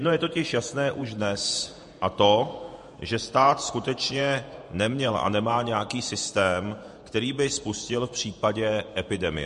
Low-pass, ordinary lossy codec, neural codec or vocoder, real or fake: 14.4 kHz; MP3, 48 kbps; vocoder, 48 kHz, 128 mel bands, Vocos; fake